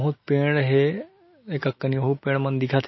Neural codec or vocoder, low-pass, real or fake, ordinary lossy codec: none; 7.2 kHz; real; MP3, 24 kbps